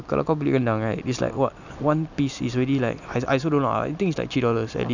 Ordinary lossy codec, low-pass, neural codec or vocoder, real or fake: none; 7.2 kHz; none; real